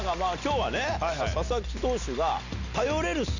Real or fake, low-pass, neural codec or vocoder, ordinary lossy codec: real; 7.2 kHz; none; none